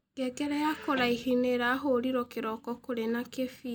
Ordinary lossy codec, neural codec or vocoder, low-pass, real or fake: none; none; none; real